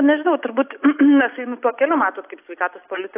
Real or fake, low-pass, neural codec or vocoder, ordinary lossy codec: real; 3.6 kHz; none; AAC, 24 kbps